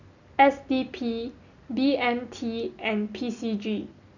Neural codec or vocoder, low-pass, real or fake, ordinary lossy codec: none; 7.2 kHz; real; none